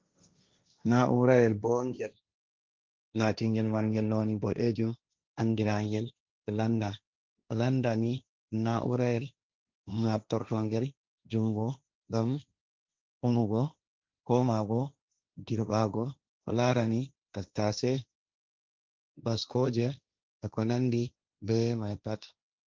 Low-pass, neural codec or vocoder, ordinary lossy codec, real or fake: 7.2 kHz; codec, 16 kHz, 1.1 kbps, Voila-Tokenizer; Opus, 32 kbps; fake